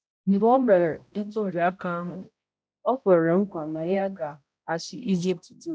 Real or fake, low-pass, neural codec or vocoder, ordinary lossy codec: fake; none; codec, 16 kHz, 0.5 kbps, X-Codec, HuBERT features, trained on balanced general audio; none